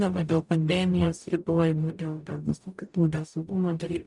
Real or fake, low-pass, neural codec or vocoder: fake; 10.8 kHz; codec, 44.1 kHz, 0.9 kbps, DAC